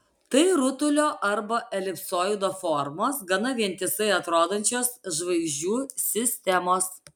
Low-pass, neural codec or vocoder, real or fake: 19.8 kHz; none; real